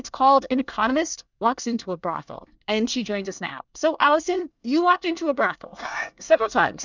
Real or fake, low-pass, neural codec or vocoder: fake; 7.2 kHz; codec, 24 kHz, 1 kbps, SNAC